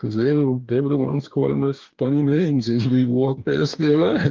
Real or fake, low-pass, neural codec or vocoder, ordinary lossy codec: fake; 7.2 kHz; codec, 44.1 kHz, 2.6 kbps, DAC; Opus, 24 kbps